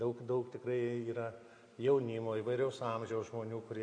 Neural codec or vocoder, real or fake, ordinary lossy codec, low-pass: none; real; AAC, 48 kbps; 9.9 kHz